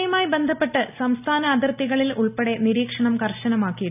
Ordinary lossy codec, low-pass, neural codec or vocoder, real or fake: none; 3.6 kHz; none; real